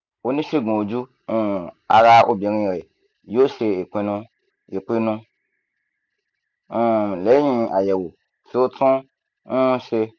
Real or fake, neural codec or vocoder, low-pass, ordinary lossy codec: real; none; 7.2 kHz; none